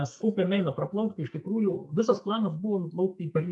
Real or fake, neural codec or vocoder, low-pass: fake; codec, 44.1 kHz, 2.6 kbps, SNAC; 10.8 kHz